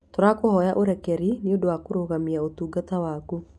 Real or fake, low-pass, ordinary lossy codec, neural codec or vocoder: real; none; none; none